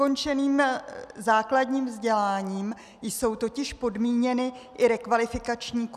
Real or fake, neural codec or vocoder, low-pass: real; none; 14.4 kHz